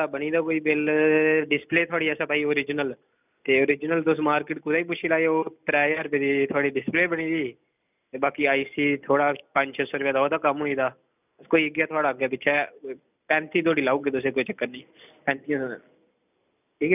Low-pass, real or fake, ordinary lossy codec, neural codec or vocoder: 3.6 kHz; real; none; none